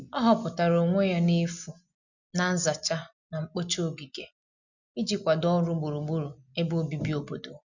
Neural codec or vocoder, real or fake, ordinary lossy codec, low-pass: none; real; none; 7.2 kHz